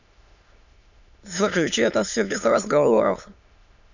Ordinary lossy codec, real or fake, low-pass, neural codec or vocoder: none; fake; 7.2 kHz; autoencoder, 22.05 kHz, a latent of 192 numbers a frame, VITS, trained on many speakers